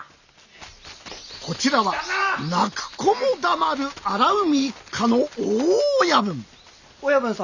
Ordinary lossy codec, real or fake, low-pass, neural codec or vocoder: none; real; 7.2 kHz; none